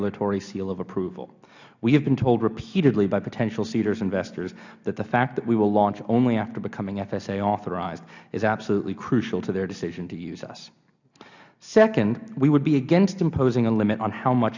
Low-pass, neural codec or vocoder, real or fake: 7.2 kHz; none; real